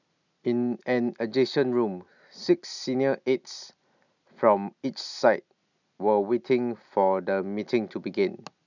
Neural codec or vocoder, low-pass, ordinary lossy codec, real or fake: none; 7.2 kHz; none; real